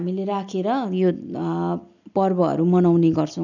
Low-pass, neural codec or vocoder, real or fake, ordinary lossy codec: 7.2 kHz; none; real; none